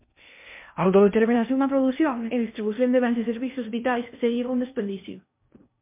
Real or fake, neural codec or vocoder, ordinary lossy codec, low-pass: fake; codec, 16 kHz in and 24 kHz out, 0.6 kbps, FocalCodec, streaming, 2048 codes; MP3, 32 kbps; 3.6 kHz